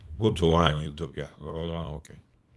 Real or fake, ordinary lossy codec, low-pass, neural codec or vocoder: fake; none; none; codec, 24 kHz, 0.9 kbps, WavTokenizer, small release